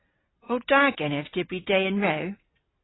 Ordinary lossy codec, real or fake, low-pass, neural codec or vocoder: AAC, 16 kbps; real; 7.2 kHz; none